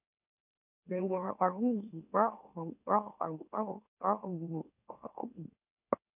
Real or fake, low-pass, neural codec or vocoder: fake; 3.6 kHz; autoencoder, 44.1 kHz, a latent of 192 numbers a frame, MeloTTS